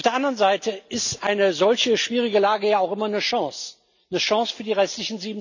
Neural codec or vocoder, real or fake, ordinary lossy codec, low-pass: none; real; none; 7.2 kHz